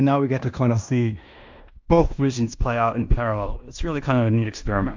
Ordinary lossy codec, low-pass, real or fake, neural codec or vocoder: MP3, 64 kbps; 7.2 kHz; fake; codec, 16 kHz in and 24 kHz out, 0.9 kbps, LongCat-Audio-Codec, fine tuned four codebook decoder